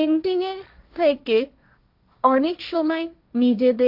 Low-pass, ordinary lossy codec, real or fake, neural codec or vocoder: 5.4 kHz; AAC, 48 kbps; fake; codec, 16 kHz, 1.1 kbps, Voila-Tokenizer